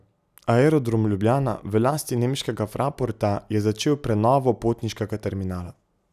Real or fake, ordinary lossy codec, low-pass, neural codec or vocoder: real; none; 14.4 kHz; none